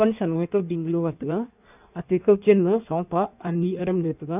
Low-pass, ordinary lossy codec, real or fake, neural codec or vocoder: 3.6 kHz; none; fake; codec, 16 kHz in and 24 kHz out, 1.1 kbps, FireRedTTS-2 codec